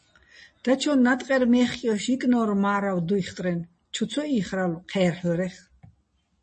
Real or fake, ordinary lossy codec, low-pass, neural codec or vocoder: real; MP3, 32 kbps; 10.8 kHz; none